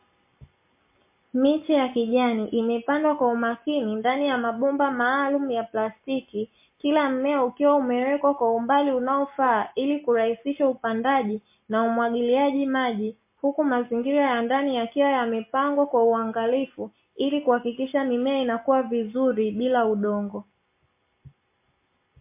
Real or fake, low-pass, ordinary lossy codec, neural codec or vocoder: real; 3.6 kHz; MP3, 24 kbps; none